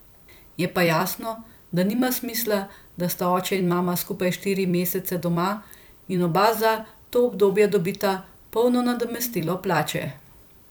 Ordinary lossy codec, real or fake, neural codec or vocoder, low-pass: none; fake; vocoder, 44.1 kHz, 128 mel bands every 256 samples, BigVGAN v2; none